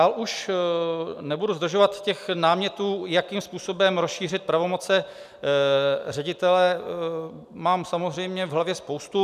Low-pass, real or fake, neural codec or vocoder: 14.4 kHz; real; none